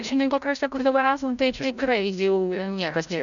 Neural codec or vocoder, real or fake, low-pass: codec, 16 kHz, 0.5 kbps, FreqCodec, larger model; fake; 7.2 kHz